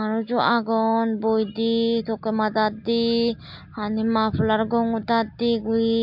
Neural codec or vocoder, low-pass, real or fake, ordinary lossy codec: none; 5.4 kHz; real; MP3, 48 kbps